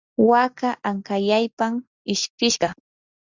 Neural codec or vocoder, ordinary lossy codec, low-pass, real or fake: none; Opus, 64 kbps; 7.2 kHz; real